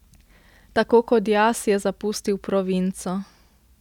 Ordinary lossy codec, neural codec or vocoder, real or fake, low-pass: none; none; real; 19.8 kHz